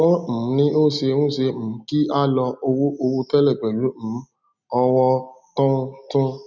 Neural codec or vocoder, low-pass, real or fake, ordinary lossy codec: none; 7.2 kHz; real; none